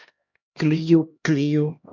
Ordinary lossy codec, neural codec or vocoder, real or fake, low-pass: MP3, 48 kbps; codec, 16 kHz, 1 kbps, X-Codec, HuBERT features, trained on LibriSpeech; fake; 7.2 kHz